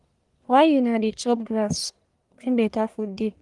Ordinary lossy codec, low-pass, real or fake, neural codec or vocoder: Opus, 24 kbps; 10.8 kHz; fake; codec, 44.1 kHz, 1.7 kbps, Pupu-Codec